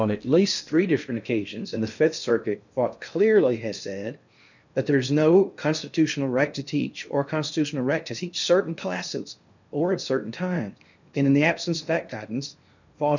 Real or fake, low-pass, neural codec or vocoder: fake; 7.2 kHz; codec, 16 kHz in and 24 kHz out, 0.6 kbps, FocalCodec, streaming, 4096 codes